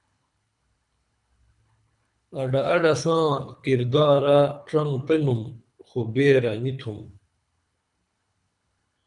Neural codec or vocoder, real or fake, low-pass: codec, 24 kHz, 3 kbps, HILCodec; fake; 10.8 kHz